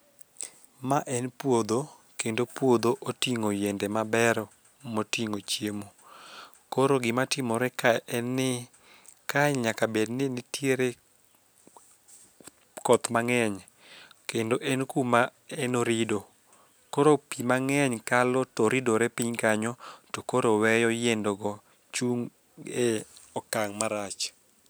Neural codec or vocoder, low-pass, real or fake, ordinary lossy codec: none; none; real; none